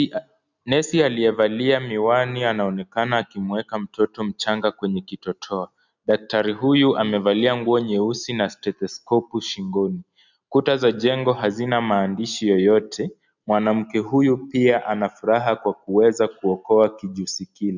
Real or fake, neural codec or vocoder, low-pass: real; none; 7.2 kHz